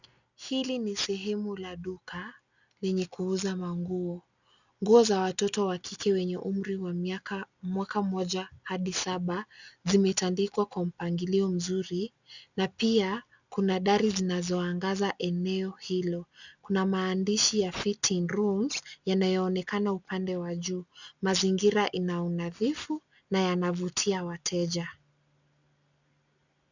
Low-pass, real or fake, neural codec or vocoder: 7.2 kHz; real; none